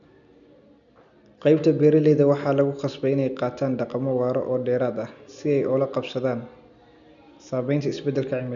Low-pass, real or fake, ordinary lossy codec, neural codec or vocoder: 7.2 kHz; real; none; none